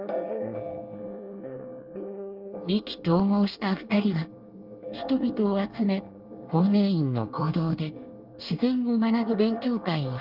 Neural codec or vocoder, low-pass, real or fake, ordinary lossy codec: codec, 24 kHz, 1 kbps, SNAC; 5.4 kHz; fake; Opus, 24 kbps